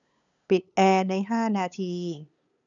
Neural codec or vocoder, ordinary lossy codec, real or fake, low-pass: codec, 16 kHz, 8 kbps, FunCodec, trained on LibriTTS, 25 frames a second; none; fake; 7.2 kHz